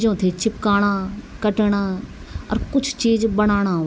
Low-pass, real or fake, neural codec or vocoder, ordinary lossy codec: none; real; none; none